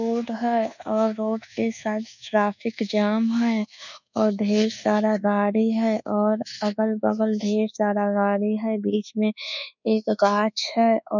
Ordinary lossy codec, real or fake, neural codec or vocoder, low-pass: none; fake; codec, 24 kHz, 1.2 kbps, DualCodec; 7.2 kHz